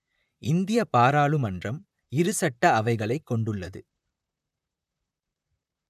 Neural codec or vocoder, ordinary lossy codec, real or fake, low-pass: vocoder, 44.1 kHz, 128 mel bands, Pupu-Vocoder; none; fake; 14.4 kHz